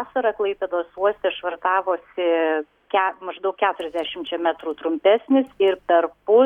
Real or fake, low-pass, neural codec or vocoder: real; 19.8 kHz; none